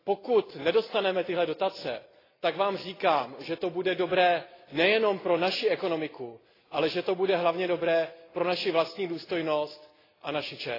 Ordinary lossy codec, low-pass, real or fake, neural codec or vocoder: AAC, 24 kbps; 5.4 kHz; real; none